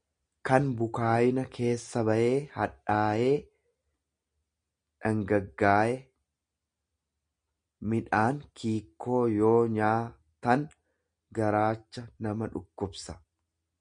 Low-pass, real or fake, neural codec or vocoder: 9.9 kHz; real; none